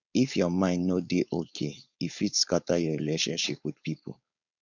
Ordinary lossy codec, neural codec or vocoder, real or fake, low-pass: none; codec, 16 kHz, 4.8 kbps, FACodec; fake; 7.2 kHz